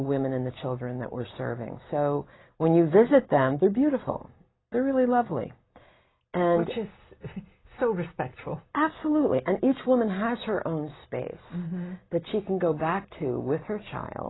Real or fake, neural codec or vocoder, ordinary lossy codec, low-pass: real; none; AAC, 16 kbps; 7.2 kHz